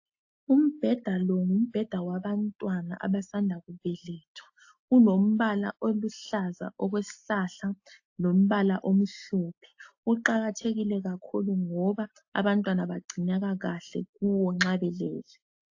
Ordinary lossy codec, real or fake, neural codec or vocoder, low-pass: AAC, 48 kbps; real; none; 7.2 kHz